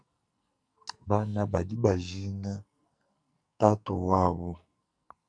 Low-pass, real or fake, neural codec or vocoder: 9.9 kHz; fake; codec, 44.1 kHz, 2.6 kbps, SNAC